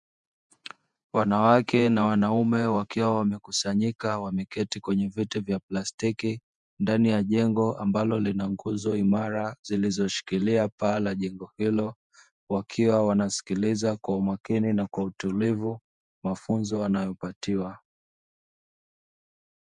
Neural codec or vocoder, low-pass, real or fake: vocoder, 48 kHz, 128 mel bands, Vocos; 10.8 kHz; fake